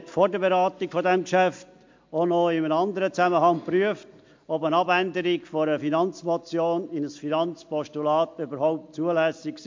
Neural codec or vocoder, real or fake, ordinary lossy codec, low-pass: none; real; MP3, 48 kbps; 7.2 kHz